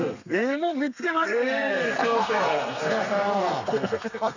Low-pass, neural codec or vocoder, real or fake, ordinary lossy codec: 7.2 kHz; codec, 32 kHz, 1.9 kbps, SNAC; fake; none